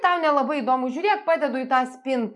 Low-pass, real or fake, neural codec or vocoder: 10.8 kHz; real; none